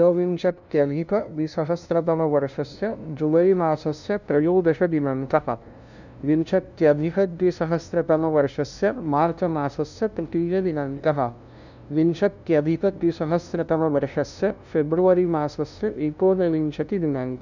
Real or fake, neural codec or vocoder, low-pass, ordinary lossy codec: fake; codec, 16 kHz, 0.5 kbps, FunCodec, trained on LibriTTS, 25 frames a second; 7.2 kHz; none